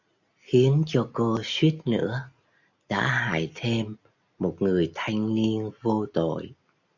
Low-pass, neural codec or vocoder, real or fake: 7.2 kHz; none; real